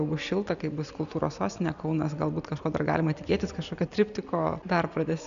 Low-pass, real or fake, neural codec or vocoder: 7.2 kHz; real; none